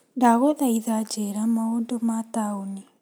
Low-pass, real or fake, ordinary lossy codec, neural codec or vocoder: none; real; none; none